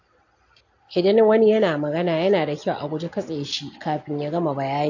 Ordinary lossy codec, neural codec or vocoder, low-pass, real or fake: AAC, 48 kbps; none; 7.2 kHz; real